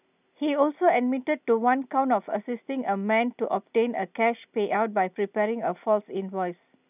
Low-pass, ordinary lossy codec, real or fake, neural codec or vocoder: 3.6 kHz; none; real; none